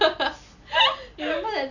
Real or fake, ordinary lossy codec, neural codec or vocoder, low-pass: real; none; none; 7.2 kHz